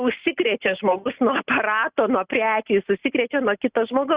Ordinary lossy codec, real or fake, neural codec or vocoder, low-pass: Opus, 64 kbps; real; none; 3.6 kHz